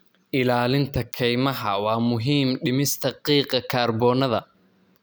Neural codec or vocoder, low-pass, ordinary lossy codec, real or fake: none; none; none; real